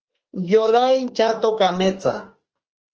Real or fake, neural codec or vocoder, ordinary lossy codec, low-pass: fake; autoencoder, 48 kHz, 32 numbers a frame, DAC-VAE, trained on Japanese speech; Opus, 16 kbps; 7.2 kHz